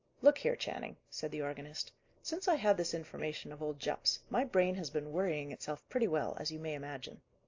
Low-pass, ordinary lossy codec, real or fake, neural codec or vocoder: 7.2 kHz; AAC, 48 kbps; real; none